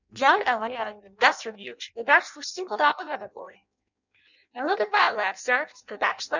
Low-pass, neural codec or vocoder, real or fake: 7.2 kHz; codec, 16 kHz in and 24 kHz out, 0.6 kbps, FireRedTTS-2 codec; fake